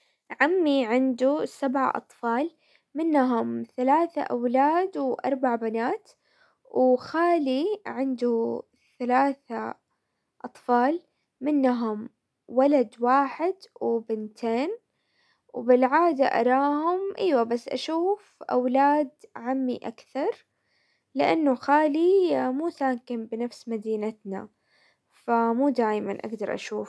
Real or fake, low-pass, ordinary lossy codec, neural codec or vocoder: real; none; none; none